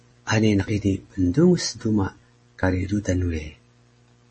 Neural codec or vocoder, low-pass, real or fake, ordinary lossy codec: none; 10.8 kHz; real; MP3, 32 kbps